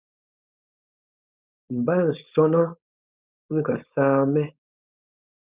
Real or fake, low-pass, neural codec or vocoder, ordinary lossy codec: fake; 3.6 kHz; codec, 16 kHz, 4.8 kbps, FACodec; Opus, 64 kbps